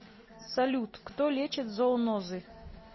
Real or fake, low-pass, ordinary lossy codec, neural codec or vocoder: real; 7.2 kHz; MP3, 24 kbps; none